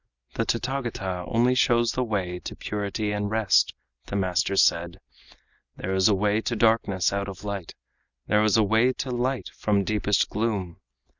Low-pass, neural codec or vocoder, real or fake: 7.2 kHz; none; real